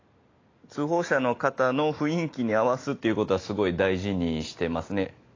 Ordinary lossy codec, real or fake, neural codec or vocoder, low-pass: AAC, 32 kbps; real; none; 7.2 kHz